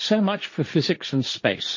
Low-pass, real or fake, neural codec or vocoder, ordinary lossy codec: 7.2 kHz; fake; codec, 16 kHz, 1.1 kbps, Voila-Tokenizer; MP3, 32 kbps